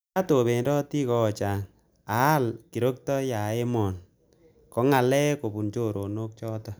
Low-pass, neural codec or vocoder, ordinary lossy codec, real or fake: none; none; none; real